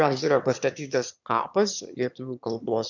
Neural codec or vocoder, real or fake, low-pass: autoencoder, 22.05 kHz, a latent of 192 numbers a frame, VITS, trained on one speaker; fake; 7.2 kHz